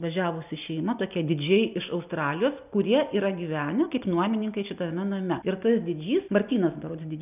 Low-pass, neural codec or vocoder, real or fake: 3.6 kHz; none; real